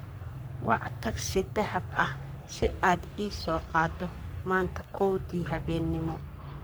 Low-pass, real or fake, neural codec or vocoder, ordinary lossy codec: none; fake; codec, 44.1 kHz, 3.4 kbps, Pupu-Codec; none